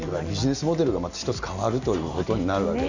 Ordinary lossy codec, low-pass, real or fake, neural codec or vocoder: none; 7.2 kHz; real; none